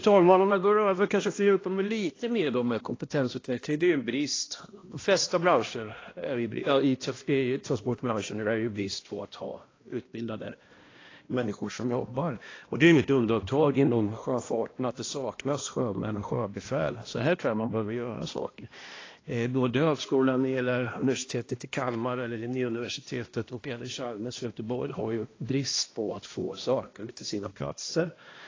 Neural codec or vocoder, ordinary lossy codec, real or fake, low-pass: codec, 16 kHz, 1 kbps, X-Codec, HuBERT features, trained on balanced general audio; AAC, 32 kbps; fake; 7.2 kHz